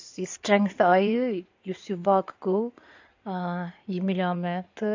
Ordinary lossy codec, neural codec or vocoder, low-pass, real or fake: AAC, 48 kbps; codec, 16 kHz in and 24 kHz out, 2.2 kbps, FireRedTTS-2 codec; 7.2 kHz; fake